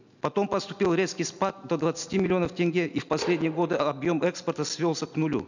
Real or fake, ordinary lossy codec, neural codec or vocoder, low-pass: real; none; none; 7.2 kHz